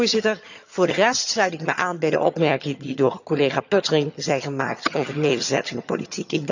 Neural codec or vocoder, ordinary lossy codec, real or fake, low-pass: vocoder, 22.05 kHz, 80 mel bands, HiFi-GAN; none; fake; 7.2 kHz